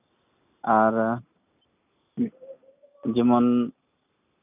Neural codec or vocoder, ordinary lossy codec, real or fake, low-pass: none; none; real; 3.6 kHz